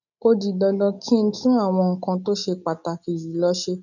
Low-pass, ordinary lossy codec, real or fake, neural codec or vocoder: 7.2 kHz; none; real; none